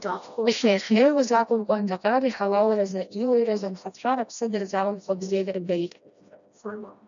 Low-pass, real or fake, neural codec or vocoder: 7.2 kHz; fake; codec, 16 kHz, 1 kbps, FreqCodec, smaller model